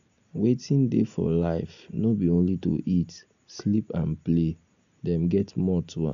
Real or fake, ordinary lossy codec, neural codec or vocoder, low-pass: real; none; none; 7.2 kHz